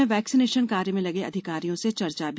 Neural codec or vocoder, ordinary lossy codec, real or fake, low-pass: none; none; real; none